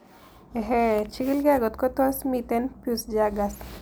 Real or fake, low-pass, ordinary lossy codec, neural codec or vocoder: real; none; none; none